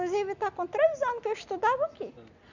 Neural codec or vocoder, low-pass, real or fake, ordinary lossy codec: none; 7.2 kHz; real; none